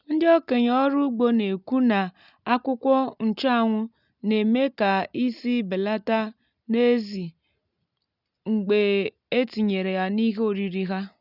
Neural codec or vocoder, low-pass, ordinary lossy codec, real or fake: none; 5.4 kHz; none; real